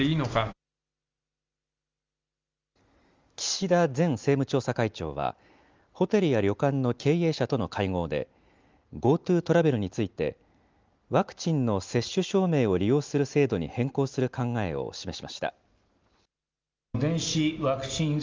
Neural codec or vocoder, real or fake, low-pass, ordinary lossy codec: none; real; 7.2 kHz; Opus, 32 kbps